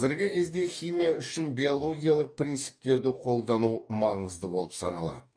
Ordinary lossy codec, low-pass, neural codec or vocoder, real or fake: none; 9.9 kHz; codec, 44.1 kHz, 2.6 kbps, DAC; fake